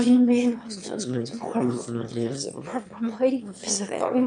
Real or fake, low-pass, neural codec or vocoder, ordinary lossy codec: fake; 9.9 kHz; autoencoder, 22.05 kHz, a latent of 192 numbers a frame, VITS, trained on one speaker; MP3, 96 kbps